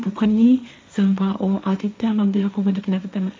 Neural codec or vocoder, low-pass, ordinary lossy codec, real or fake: codec, 16 kHz, 1.1 kbps, Voila-Tokenizer; none; none; fake